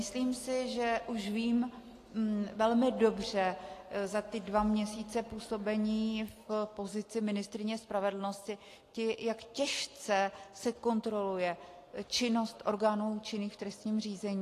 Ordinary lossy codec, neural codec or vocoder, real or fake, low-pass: AAC, 48 kbps; none; real; 14.4 kHz